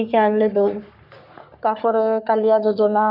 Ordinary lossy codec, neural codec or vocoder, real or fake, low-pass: none; codec, 44.1 kHz, 3.4 kbps, Pupu-Codec; fake; 5.4 kHz